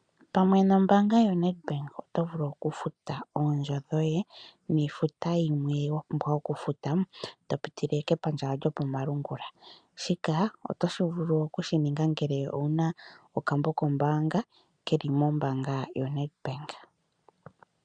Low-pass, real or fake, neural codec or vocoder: 9.9 kHz; real; none